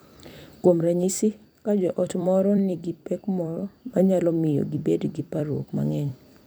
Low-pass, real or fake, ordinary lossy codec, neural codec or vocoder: none; fake; none; vocoder, 44.1 kHz, 128 mel bands every 256 samples, BigVGAN v2